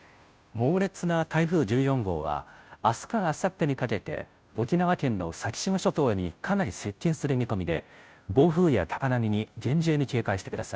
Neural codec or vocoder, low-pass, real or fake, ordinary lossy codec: codec, 16 kHz, 0.5 kbps, FunCodec, trained on Chinese and English, 25 frames a second; none; fake; none